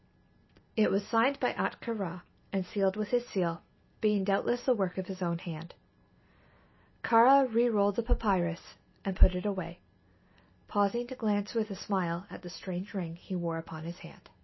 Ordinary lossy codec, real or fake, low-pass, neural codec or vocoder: MP3, 24 kbps; real; 7.2 kHz; none